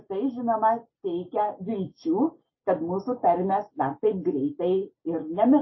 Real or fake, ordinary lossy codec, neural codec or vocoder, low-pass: real; MP3, 24 kbps; none; 7.2 kHz